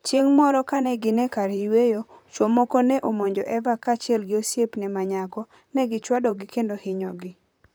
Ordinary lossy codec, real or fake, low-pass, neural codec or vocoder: none; fake; none; vocoder, 44.1 kHz, 128 mel bands, Pupu-Vocoder